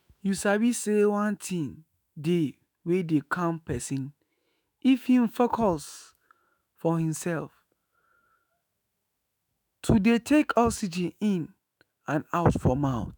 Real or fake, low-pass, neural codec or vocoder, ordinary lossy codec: fake; none; autoencoder, 48 kHz, 128 numbers a frame, DAC-VAE, trained on Japanese speech; none